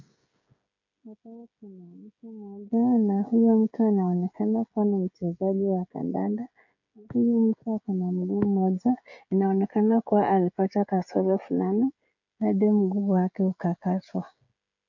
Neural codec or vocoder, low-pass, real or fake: codec, 16 kHz, 16 kbps, FreqCodec, smaller model; 7.2 kHz; fake